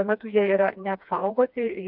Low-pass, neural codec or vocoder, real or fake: 5.4 kHz; codec, 16 kHz, 2 kbps, FreqCodec, smaller model; fake